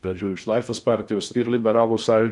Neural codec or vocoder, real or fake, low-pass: codec, 16 kHz in and 24 kHz out, 0.8 kbps, FocalCodec, streaming, 65536 codes; fake; 10.8 kHz